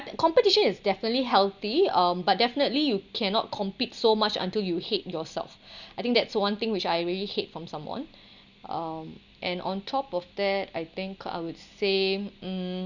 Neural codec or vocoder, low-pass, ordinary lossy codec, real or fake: none; 7.2 kHz; none; real